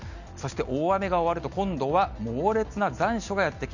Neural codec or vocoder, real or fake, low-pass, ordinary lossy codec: none; real; 7.2 kHz; none